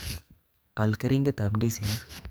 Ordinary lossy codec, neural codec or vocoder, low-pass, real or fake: none; codec, 44.1 kHz, 2.6 kbps, SNAC; none; fake